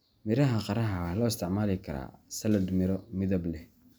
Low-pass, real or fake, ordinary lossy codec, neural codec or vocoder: none; real; none; none